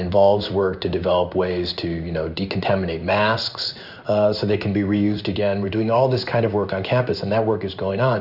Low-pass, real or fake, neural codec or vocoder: 5.4 kHz; fake; codec, 16 kHz in and 24 kHz out, 1 kbps, XY-Tokenizer